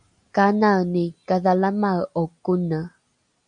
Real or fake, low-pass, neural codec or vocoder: real; 9.9 kHz; none